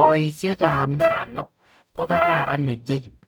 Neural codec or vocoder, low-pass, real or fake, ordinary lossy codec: codec, 44.1 kHz, 0.9 kbps, DAC; 19.8 kHz; fake; none